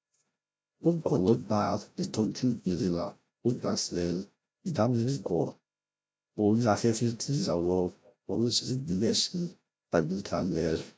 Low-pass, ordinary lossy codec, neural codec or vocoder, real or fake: none; none; codec, 16 kHz, 0.5 kbps, FreqCodec, larger model; fake